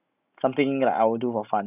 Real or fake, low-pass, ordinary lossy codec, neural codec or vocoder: real; 3.6 kHz; none; none